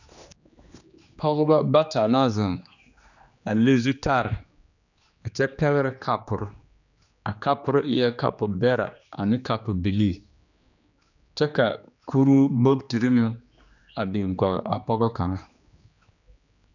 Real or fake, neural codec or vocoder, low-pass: fake; codec, 16 kHz, 2 kbps, X-Codec, HuBERT features, trained on general audio; 7.2 kHz